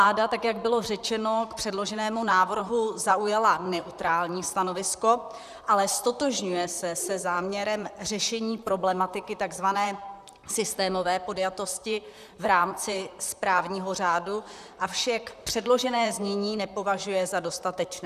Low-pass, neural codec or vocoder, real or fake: 14.4 kHz; vocoder, 44.1 kHz, 128 mel bands, Pupu-Vocoder; fake